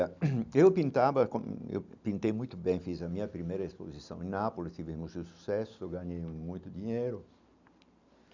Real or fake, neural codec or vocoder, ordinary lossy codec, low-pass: real; none; none; 7.2 kHz